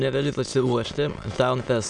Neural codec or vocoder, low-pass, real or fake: autoencoder, 22.05 kHz, a latent of 192 numbers a frame, VITS, trained on many speakers; 9.9 kHz; fake